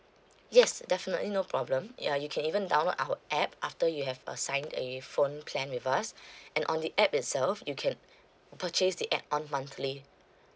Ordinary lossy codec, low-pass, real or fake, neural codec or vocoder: none; none; real; none